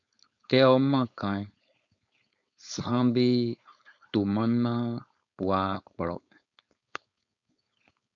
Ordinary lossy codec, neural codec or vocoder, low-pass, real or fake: MP3, 96 kbps; codec, 16 kHz, 4.8 kbps, FACodec; 7.2 kHz; fake